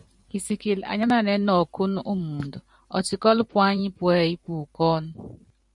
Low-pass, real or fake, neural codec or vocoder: 10.8 kHz; fake; vocoder, 44.1 kHz, 128 mel bands every 512 samples, BigVGAN v2